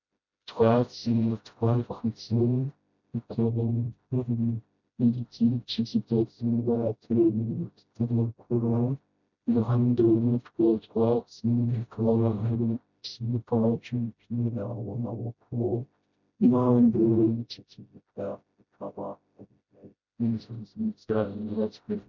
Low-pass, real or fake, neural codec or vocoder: 7.2 kHz; fake; codec, 16 kHz, 0.5 kbps, FreqCodec, smaller model